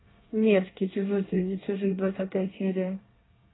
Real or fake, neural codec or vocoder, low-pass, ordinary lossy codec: fake; codec, 24 kHz, 1 kbps, SNAC; 7.2 kHz; AAC, 16 kbps